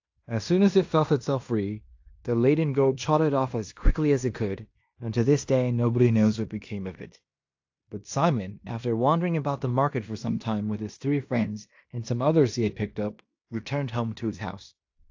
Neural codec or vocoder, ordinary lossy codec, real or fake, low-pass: codec, 16 kHz in and 24 kHz out, 0.9 kbps, LongCat-Audio-Codec, fine tuned four codebook decoder; AAC, 48 kbps; fake; 7.2 kHz